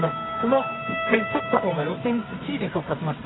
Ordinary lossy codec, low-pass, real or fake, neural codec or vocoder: AAC, 16 kbps; 7.2 kHz; fake; codec, 24 kHz, 0.9 kbps, WavTokenizer, medium music audio release